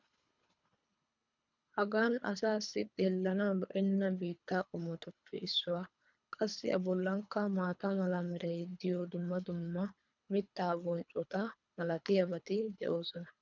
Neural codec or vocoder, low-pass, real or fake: codec, 24 kHz, 3 kbps, HILCodec; 7.2 kHz; fake